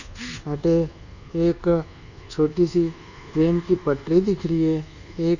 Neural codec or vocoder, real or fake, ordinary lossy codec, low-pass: codec, 24 kHz, 1.2 kbps, DualCodec; fake; none; 7.2 kHz